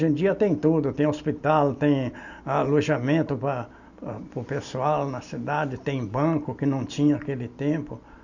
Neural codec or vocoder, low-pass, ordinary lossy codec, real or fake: none; 7.2 kHz; none; real